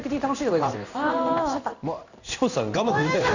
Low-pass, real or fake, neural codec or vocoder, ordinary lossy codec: 7.2 kHz; fake; codec, 16 kHz in and 24 kHz out, 1 kbps, XY-Tokenizer; none